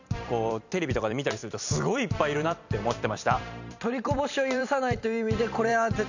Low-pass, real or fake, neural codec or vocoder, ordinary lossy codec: 7.2 kHz; fake; vocoder, 44.1 kHz, 128 mel bands every 256 samples, BigVGAN v2; none